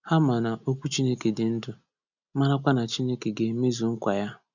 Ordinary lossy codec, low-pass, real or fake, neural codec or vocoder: none; 7.2 kHz; real; none